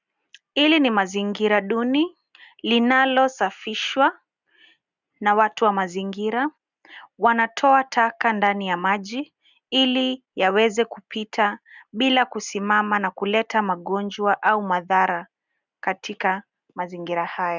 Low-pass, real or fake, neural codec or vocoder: 7.2 kHz; real; none